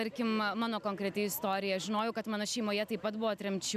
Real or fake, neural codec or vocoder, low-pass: real; none; 14.4 kHz